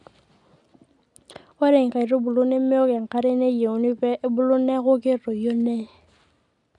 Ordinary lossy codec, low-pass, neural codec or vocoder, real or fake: none; 10.8 kHz; none; real